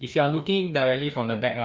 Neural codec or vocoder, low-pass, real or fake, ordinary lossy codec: codec, 16 kHz, 2 kbps, FreqCodec, larger model; none; fake; none